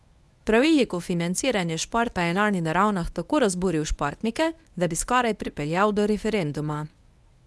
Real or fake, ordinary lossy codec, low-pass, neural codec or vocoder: fake; none; none; codec, 24 kHz, 0.9 kbps, WavTokenizer, medium speech release version 1